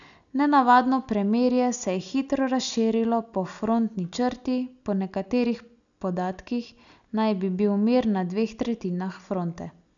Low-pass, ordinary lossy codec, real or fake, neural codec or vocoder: 7.2 kHz; none; real; none